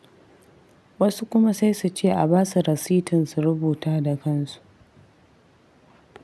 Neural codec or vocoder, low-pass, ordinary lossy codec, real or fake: none; none; none; real